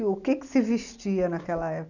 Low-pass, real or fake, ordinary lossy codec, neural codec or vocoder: 7.2 kHz; real; none; none